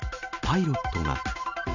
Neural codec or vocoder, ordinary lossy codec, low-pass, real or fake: none; none; 7.2 kHz; real